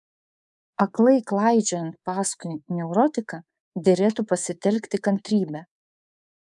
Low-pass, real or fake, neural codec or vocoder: 10.8 kHz; fake; codec, 24 kHz, 3.1 kbps, DualCodec